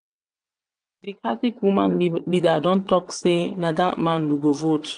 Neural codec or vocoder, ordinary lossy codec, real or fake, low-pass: vocoder, 22.05 kHz, 80 mel bands, WaveNeXt; none; fake; 9.9 kHz